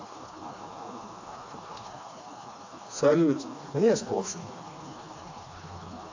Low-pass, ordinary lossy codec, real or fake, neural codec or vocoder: 7.2 kHz; AAC, 48 kbps; fake; codec, 16 kHz, 2 kbps, FreqCodec, smaller model